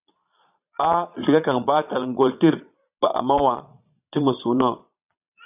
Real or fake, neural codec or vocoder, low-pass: fake; vocoder, 22.05 kHz, 80 mel bands, Vocos; 3.6 kHz